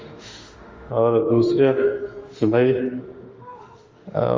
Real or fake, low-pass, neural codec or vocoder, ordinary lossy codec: fake; 7.2 kHz; autoencoder, 48 kHz, 32 numbers a frame, DAC-VAE, trained on Japanese speech; Opus, 32 kbps